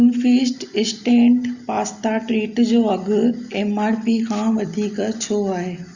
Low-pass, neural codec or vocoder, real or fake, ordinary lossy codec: 7.2 kHz; none; real; Opus, 64 kbps